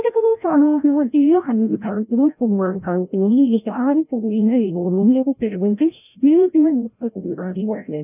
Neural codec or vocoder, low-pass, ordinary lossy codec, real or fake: codec, 16 kHz, 0.5 kbps, FreqCodec, larger model; 3.6 kHz; none; fake